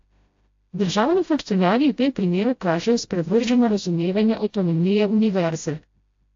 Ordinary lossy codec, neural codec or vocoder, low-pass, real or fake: AAC, 48 kbps; codec, 16 kHz, 0.5 kbps, FreqCodec, smaller model; 7.2 kHz; fake